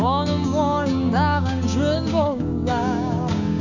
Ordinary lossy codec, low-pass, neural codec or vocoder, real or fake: none; 7.2 kHz; codec, 16 kHz, 6 kbps, DAC; fake